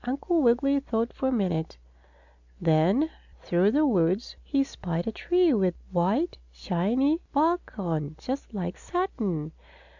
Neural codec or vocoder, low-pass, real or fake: none; 7.2 kHz; real